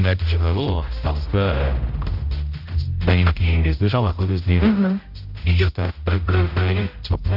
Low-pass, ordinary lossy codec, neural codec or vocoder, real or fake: 5.4 kHz; none; codec, 16 kHz, 0.5 kbps, X-Codec, HuBERT features, trained on general audio; fake